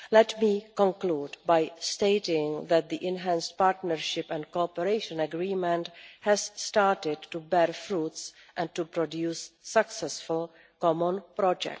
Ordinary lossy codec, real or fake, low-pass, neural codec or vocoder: none; real; none; none